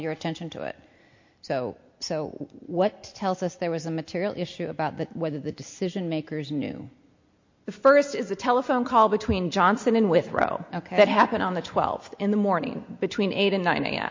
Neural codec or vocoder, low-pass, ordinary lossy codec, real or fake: none; 7.2 kHz; MP3, 48 kbps; real